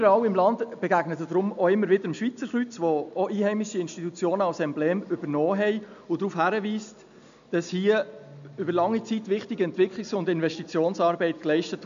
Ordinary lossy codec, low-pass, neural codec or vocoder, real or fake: none; 7.2 kHz; none; real